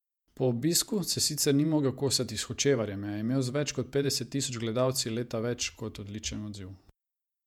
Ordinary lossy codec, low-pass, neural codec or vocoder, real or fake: MP3, 96 kbps; 19.8 kHz; vocoder, 48 kHz, 128 mel bands, Vocos; fake